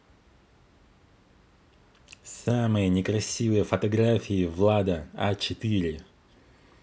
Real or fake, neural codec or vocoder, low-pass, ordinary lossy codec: real; none; none; none